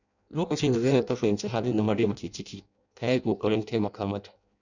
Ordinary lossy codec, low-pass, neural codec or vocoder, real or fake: none; 7.2 kHz; codec, 16 kHz in and 24 kHz out, 0.6 kbps, FireRedTTS-2 codec; fake